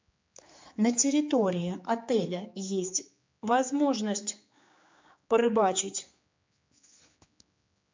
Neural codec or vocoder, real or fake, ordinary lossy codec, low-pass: codec, 16 kHz, 4 kbps, X-Codec, HuBERT features, trained on general audio; fake; MP3, 64 kbps; 7.2 kHz